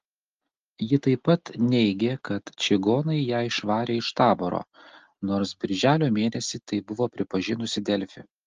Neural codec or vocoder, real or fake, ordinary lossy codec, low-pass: none; real; Opus, 32 kbps; 7.2 kHz